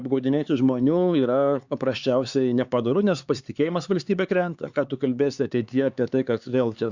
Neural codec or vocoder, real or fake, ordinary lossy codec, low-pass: codec, 16 kHz, 4 kbps, X-Codec, HuBERT features, trained on LibriSpeech; fake; Opus, 64 kbps; 7.2 kHz